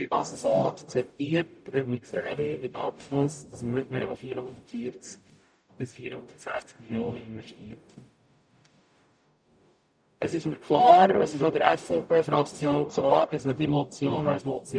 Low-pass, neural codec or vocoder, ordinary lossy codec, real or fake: 9.9 kHz; codec, 44.1 kHz, 0.9 kbps, DAC; none; fake